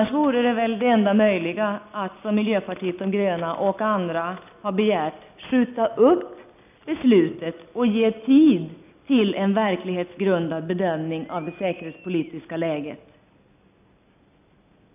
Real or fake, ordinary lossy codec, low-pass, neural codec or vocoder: real; none; 3.6 kHz; none